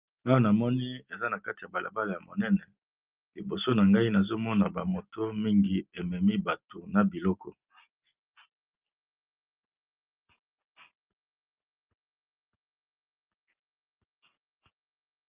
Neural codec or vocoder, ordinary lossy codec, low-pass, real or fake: none; Opus, 32 kbps; 3.6 kHz; real